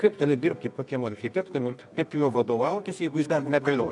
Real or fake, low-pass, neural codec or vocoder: fake; 10.8 kHz; codec, 24 kHz, 0.9 kbps, WavTokenizer, medium music audio release